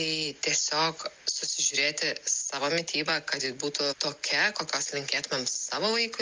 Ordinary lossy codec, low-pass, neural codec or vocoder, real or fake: Opus, 32 kbps; 7.2 kHz; none; real